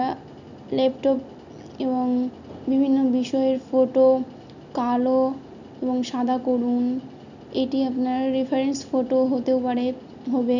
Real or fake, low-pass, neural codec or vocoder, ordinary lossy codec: real; 7.2 kHz; none; none